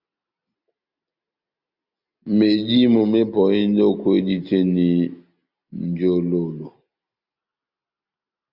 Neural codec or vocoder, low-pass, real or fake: none; 5.4 kHz; real